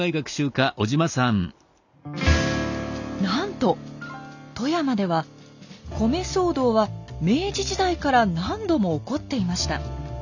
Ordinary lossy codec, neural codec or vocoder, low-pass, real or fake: none; none; 7.2 kHz; real